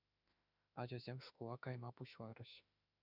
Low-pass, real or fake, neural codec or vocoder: 5.4 kHz; fake; autoencoder, 48 kHz, 32 numbers a frame, DAC-VAE, trained on Japanese speech